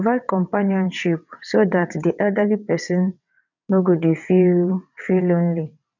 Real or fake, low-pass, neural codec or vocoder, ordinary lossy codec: fake; 7.2 kHz; vocoder, 22.05 kHz, 80 mel bands, WaveNeXt; none